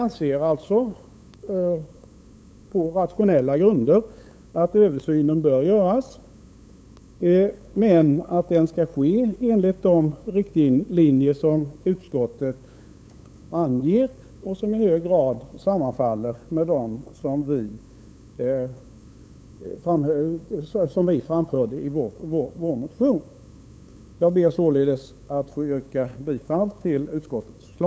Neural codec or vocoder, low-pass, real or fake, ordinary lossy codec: codec, 16 kHz, 8 kbps, FunCodec, trained on LibriTTS, 25 frames a second; none; fake; none